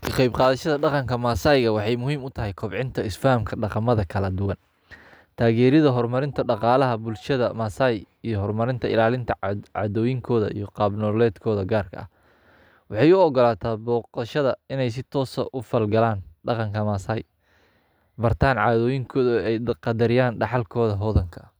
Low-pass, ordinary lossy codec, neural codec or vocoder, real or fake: none; none; none; real